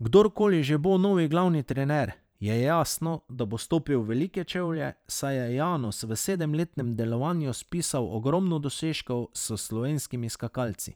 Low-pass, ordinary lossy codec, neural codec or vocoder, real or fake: none; none; vocoder, 44.1 kHz, 128 mel bands every 512 samples, BigVGAN v2; fake